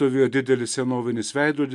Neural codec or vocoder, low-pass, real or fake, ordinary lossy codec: none; 10.8 kHz; real; MP3, 96 kbps